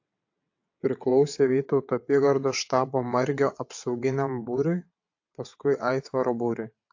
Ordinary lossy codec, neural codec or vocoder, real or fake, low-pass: AAC, 48 kbps; vocoder, 22.05 kHz, 80 mel bands, WaveNeXt; fake; 7.2 kHz